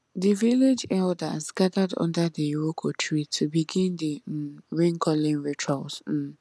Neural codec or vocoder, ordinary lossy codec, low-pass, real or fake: none; none; none; real